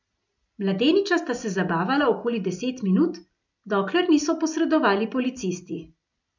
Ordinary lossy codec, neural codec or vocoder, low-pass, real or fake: none; none; 7.2 kHz; real